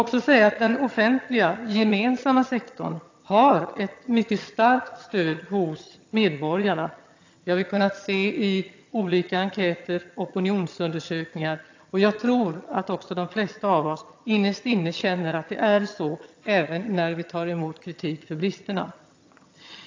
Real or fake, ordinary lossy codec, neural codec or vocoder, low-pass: fake; AAC, 48 kbps; vocoder, 22.05 kHz, 80 mel bands, HiFi-GAN; 7.2 kHz